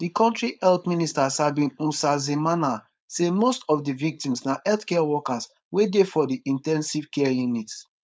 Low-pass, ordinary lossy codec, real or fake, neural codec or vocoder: none; none; fake; codec, 16 kHz, 4.8 kbps, FACodec